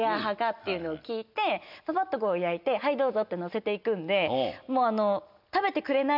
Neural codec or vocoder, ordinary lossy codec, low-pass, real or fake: none; none; 5.4 kHz; real